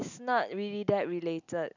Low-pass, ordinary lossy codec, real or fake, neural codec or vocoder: 7.2 kHz; none; real; none